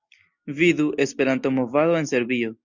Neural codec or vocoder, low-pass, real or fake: none; 7.2 kHz; real